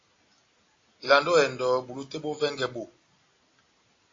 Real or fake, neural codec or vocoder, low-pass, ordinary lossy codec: real; none; 7.2 kHz; AAC, 32 kbps